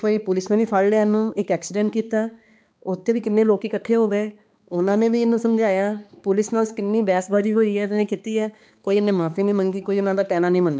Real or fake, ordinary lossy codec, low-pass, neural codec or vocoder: fake; none; none; codec, 16 kHz, 2 kbps, X-Codec, HuBERT features, trained on balanced general audio